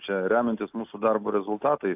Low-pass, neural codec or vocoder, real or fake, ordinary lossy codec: 3.6 kHz; none; real; AAC, 32 kbps